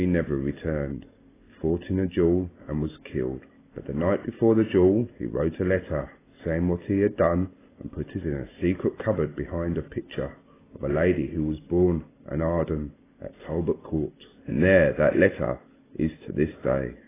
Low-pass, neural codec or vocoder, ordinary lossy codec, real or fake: 3.6 kHz; none; AAC, 16 kbps; real